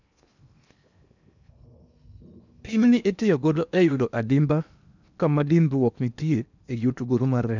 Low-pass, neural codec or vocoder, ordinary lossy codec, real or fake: 7.2 kHz; codec, 16 kHz in and 24 kHz out, 0.8 kbps, FocalCodec, streaming, 65536 codes; none; fake